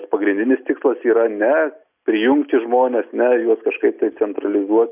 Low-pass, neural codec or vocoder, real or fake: 3.6 kHz; none; real